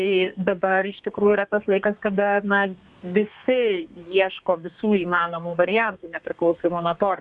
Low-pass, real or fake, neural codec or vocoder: 10.8 kHz; fake; codec, 32 kHz, 1.9 kbps, SNAC